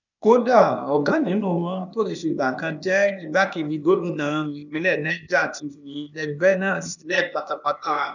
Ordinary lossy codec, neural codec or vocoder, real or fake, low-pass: none; codec, 16 kHz, 0.8 kbps, ZipCodec; fake; 7.2 kHz